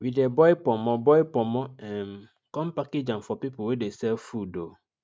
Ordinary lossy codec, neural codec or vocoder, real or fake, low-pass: none; none; real; none